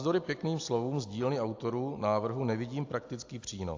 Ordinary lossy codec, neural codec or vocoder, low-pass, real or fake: AAC, 48 kbps; none; 7.2 kHz; real